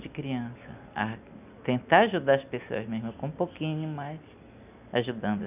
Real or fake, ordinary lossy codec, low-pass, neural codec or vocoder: real; none; 3.6 kHz; none